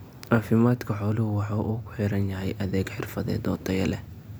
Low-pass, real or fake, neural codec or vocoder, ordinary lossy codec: none; real; none; none